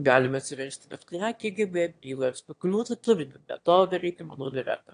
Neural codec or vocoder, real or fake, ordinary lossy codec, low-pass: autoencoder, 22.05 kHz, a latent of 192 numbers a frame, VITS, trained on one speaker; fake; AAC, 48 kbps; 9.9 kHz